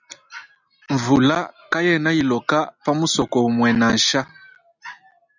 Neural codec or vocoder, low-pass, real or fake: none; 7.2 kHz; real